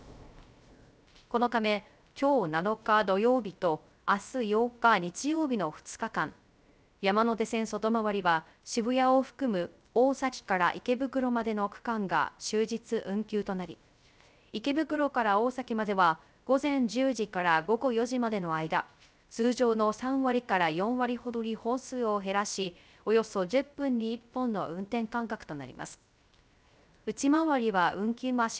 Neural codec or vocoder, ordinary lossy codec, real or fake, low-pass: codec, 16 kHz, 0.3 kbps, FocalCodec; none; fake; none